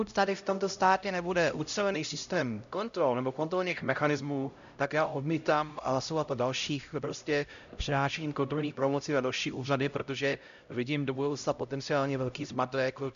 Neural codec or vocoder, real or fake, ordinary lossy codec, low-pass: codec, 16 kHz, 0.5 kbps, X-Codec, HuBERT features, trained on LibriSpeech; fake; MP3, 96 kbps; 7.2 kHz